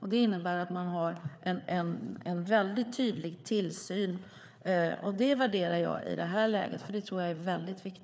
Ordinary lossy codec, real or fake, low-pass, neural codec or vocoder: none; fake; none; codec, 16 kHz, 4 kbps, FreqCodec, larger model